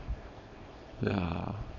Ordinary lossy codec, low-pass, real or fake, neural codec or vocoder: none; 7.2 kHz; fake; codec, 24 kHz, 0.9 kbps, WavTokenizer, small release